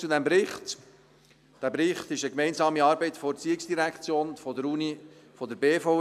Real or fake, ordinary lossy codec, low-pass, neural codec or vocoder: real; AAC, 96 kbps; 14.4 kHz; none